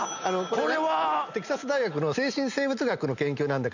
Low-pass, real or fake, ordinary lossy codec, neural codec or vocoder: 7.2 kHz; real; none; none